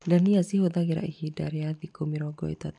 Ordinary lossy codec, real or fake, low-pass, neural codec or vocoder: none; real; 10.8 kHz; none